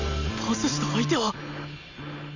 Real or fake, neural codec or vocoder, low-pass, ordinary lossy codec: real; none; 7.2 kHz; none